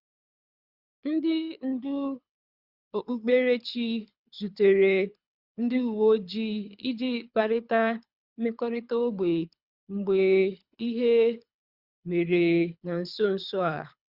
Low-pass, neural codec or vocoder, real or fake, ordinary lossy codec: 5.4 kHz; codec, 24 kHz, 6 kbps, HILCodec; fake; Opus, 64 kbps